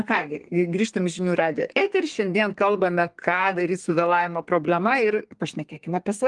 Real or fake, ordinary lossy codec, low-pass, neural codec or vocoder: fake; Opus, 24 kbps; 10.8 kHz; codec, 32 kHz, 1.9 kbps, SNAC